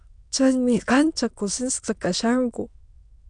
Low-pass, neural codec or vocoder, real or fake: 9.9 kHz; autoencoder, 22.05 kHz, a latent of 192 numbers a frame, VITS, trained on many speakers; fake